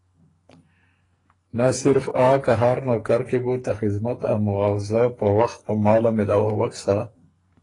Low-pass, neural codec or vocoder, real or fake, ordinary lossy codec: 10.8 kHz; codec, 44.1 kHz, 2.6 kbps, SNAC; fake; AAC, 32 kbps